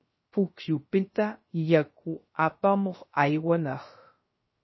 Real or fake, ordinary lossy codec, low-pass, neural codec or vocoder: fake; MP3, 24 kbps; 7.2 kHz; codec, 16 kHz, 0.3 kbps, FocalCodec